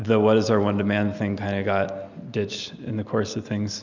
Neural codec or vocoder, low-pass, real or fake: none; 7.2 kHz; real